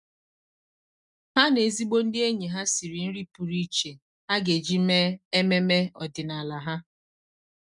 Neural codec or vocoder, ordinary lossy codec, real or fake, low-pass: none; none; real; 10.8 kHz